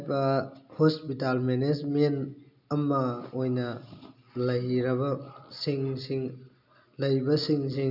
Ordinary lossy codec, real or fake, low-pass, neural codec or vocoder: none; real; 5.4 kHz; none